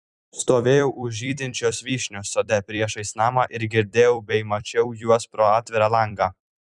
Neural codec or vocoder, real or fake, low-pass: vocoder, 44.1 kHz, 128 mel bands every 256 samples, BigVGAN v2; fake; 10.8 kHz